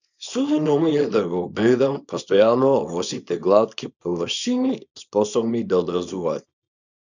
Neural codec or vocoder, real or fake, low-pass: codec, 24 kHz, 0.9 kbps, WavTokenizer, small release; fake; 7.2 kHz